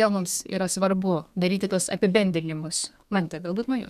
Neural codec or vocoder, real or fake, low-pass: codec, 32 kHz, 1.9 kbps, SNAC; fake; 14.4 kHz